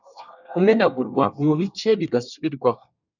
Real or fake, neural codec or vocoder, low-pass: fake; codec, 24 kHz, 1 kbps, SNAC; 7.2 kHz